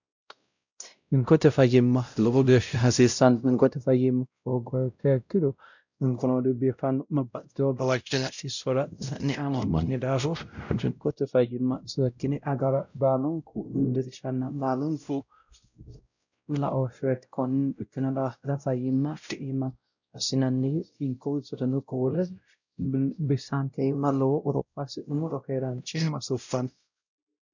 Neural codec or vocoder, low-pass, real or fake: codec, 16 kHz, 0.5 kbps, X-Codec, WavLM features, trained on Multilingual LibriSpeech; 7.2 kHz; fake